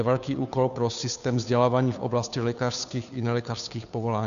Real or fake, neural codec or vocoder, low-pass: fake; codec, 16 kHz, 2 kbps, FunCodec, trained on Chinese and English, 25 frames a second; 7.2 kHz